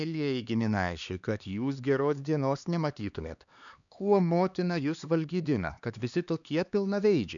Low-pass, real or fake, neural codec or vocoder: 7.2 kHz; fake; codec, 16 kHz, 2 kbps, X-Codec, HuBERT features, trained on LibriSpeech